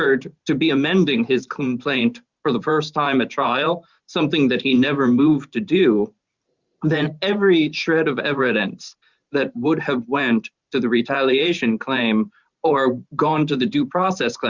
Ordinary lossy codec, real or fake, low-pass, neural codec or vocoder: Opus, 64 kbps; fake; 7.2 kHz; vocoder, 44.1 kHz, 128 mel bands every 512 samples, BigVGAN v2